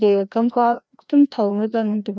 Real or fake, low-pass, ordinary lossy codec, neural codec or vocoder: fake; none; none; codec, 16 kHz, 1 kbps, FreqCodec, larger model